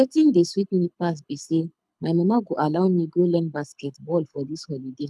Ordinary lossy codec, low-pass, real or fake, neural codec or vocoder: none; none; fake; codec, 24 kHz, 6 kbps, HILCodec